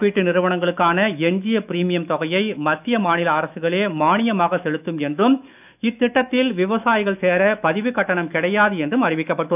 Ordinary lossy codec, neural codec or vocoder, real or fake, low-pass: none; autoencoder, 48 kHz, 128 numbers a frame, DAC-VAE, trained on Japanese speech; fake; 3.6 kHz